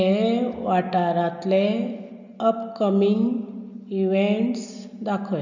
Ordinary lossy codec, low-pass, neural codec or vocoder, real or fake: none; 7.2 kHz; none; real